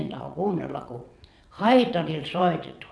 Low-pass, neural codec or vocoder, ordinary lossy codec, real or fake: none; vocoder, 22.05 kHz, 80 mel bands, WaveNeXt; none; fake